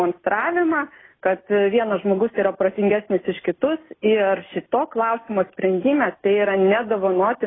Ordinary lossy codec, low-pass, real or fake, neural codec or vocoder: AAC, 16 kbps; 7.2 kHz; real; none